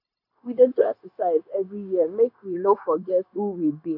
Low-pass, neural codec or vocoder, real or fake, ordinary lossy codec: 5.4 kHz; codec, 16 kHz, 0.9 kbps, LongCat-Audio-Codec; fake; none